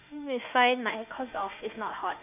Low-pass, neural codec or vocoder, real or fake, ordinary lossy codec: 3.6 kHz; autoencoder, 48 kHz, 32 numbers a frame, DAC-VAE, trained on Japanese speech; fake; none